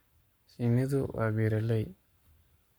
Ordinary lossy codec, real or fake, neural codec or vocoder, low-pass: none; fake; codec, 44.1 kHz, 7.8 kbps, Pupu-Codec; none